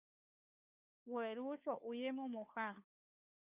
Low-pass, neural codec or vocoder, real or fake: 3.6 kHz; codec, 16 kHz, 2 kbps, FunCodec, trained on Chinese and English, 25 frames a second; fake